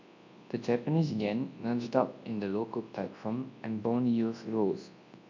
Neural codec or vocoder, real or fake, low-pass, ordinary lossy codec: codec, 24 kHz, 0.9 kbps, WavTokenizer, large speech release; fake; 7.2 kHz; MP3, 48 kbps